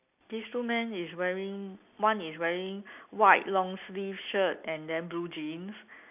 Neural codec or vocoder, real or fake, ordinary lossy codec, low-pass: none; real; none; 3.6 kHz